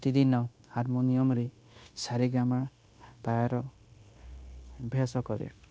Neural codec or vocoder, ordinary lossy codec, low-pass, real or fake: codec, 16 kHz, 0.9 kbps, LongCat-Audio-Codec; none; none; fake